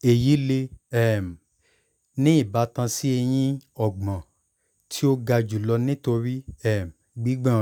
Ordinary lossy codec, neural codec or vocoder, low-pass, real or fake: none; none; 19.8 kHz; real